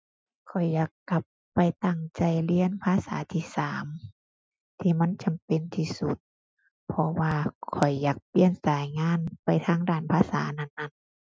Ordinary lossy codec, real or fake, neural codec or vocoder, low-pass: none; real; none; none